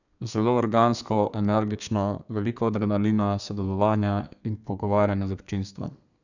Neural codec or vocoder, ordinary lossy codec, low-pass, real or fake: codec, 32 kHz, 1.9 kbps, SNAC; none; 7.2 kHz; fake